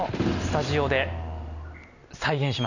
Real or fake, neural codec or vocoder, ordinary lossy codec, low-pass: real; none; none; 7.2 kHz